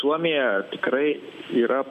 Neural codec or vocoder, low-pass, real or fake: none; 14.4 kHz; real